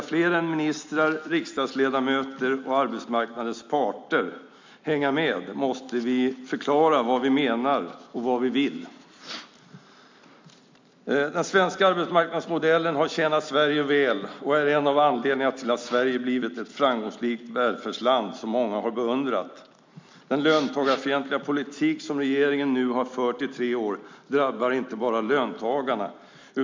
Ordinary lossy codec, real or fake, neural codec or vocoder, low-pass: MP3, 64 kbps; real; none; 7.2 kHz